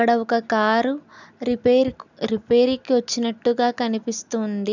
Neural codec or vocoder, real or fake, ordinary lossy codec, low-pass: none; real; none; 7.2 kHz